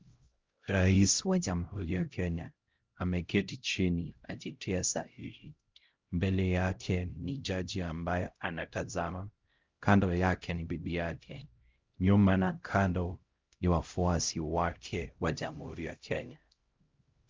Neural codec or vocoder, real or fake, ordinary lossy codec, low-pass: codec, 16 kHz, 0.5 kbps, X-Codec, HuBERT features, trained on LibriSpeech; fake; Opus, 32 kbps; 7.2 kHz